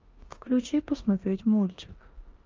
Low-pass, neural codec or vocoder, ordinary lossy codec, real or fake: 7.2 kHz; codec, 16 kHz in and 24 kHz out, 0.9 kbps, LongCat-Audio-Codec, four codebook decoder; Opus, 32 kbps; fake